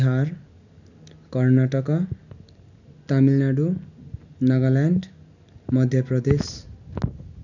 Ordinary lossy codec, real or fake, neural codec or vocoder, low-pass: none; real; none; 7.2 kHz